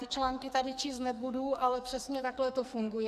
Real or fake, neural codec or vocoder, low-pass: fake; codec, 44.1 kHz, 2.6 kbps, SNAC; 14.4 kHz